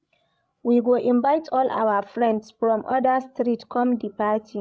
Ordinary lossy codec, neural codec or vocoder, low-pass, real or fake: none; codec, 16 kHz, 16 kbps, FreqCodec, larger model; none; fake